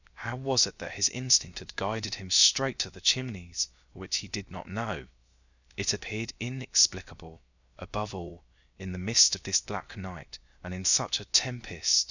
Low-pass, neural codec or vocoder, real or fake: 7.2 kHz; codec, 16 kHz, 0.3 kbps, FocalCodec; fake